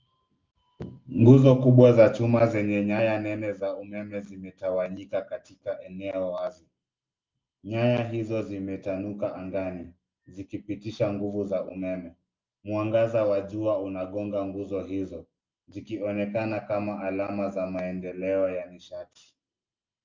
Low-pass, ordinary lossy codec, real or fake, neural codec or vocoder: 7.2 kHz; Opus, 24 kbps; real; none